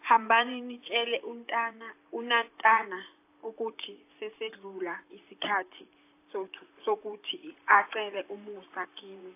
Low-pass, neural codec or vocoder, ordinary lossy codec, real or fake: 3.6 kHz; vocoder, 44.1 kHz, 128 mel bands, Pupu-Vocoder; AAC, 24 kbps; fake